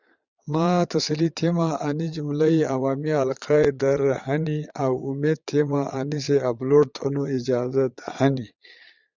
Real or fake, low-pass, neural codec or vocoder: fake; 7.2 kHz; vocoder, 22.05 kHz, 80 mel bands, Vocos